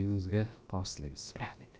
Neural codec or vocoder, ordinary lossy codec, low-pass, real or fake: codec, 16 kHz, about 1 kbps, DyCAST, with the encoder's durations; none; none; fake